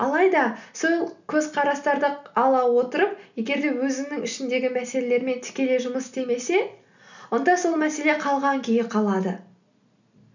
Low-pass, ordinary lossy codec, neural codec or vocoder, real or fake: 7.2 kHz; none; none; real